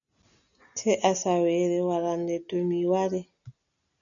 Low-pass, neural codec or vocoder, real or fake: 7.2 kHz; none; real